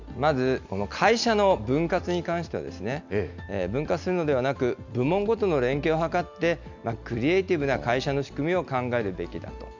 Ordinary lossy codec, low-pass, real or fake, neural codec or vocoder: none; 7.2 kHz; real; none